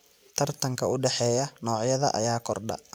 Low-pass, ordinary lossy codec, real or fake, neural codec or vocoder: none; none; real; none